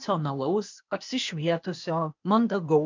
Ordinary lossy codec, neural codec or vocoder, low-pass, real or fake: MP3, 64 kbps; codec, 16 kHz, 0.8 kbps, ZipCodec; 7.2 kHz; fake